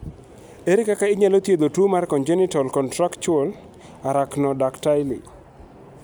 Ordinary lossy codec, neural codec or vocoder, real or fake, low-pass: none; none; real; none